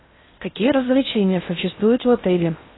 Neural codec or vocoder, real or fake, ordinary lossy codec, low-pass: codec, 16 kHz in and 24 kHz out, 0.6 kbps, FocalCodec, streaming, 2048 codes; fake; AAC, 16 kbps; 7.2 kHz